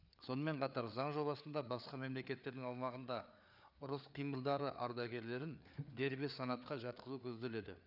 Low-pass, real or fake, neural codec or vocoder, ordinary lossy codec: 5.4 kHz; fake; codec, 16 kHz, 4 kbps, FreqCodec, larger model; none